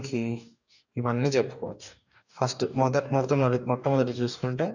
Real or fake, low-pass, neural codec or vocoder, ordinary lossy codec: fake; 7.2 kHz; codec, 44.1 kHz, 2.6 kbps, DAC; none